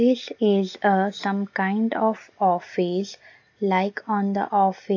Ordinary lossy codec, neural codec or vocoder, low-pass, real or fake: AAC, 48 kbps; none; 7.2 kHz; real